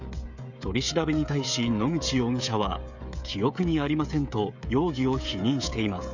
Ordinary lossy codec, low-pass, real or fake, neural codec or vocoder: none; 7.2 kHz; fake; codec, 16 kHz, 16 kbps, FreqCodec, smaller model